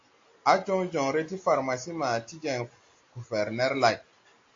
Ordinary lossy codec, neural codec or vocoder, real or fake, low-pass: AAC, 48 kbps; none; real; 7.2 kHz